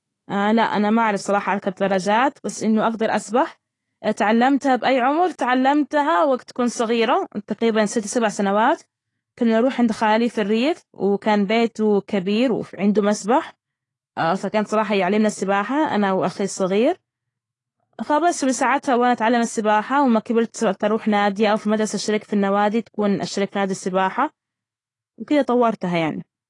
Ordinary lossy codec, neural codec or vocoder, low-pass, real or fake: AAC, 32 kbps; autoencoder, 48 kHz, 128 numbers a frame, DAC-VAE, trained on Japanese speech; 10.8 kHz; fake